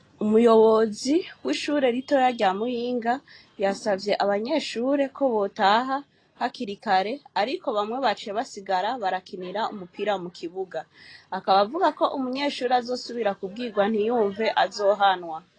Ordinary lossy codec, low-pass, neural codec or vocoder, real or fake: AAC, 32 kbps; 9.9 kHz; none; real